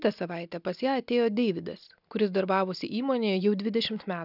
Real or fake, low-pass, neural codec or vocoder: real; 5.4 kHz; none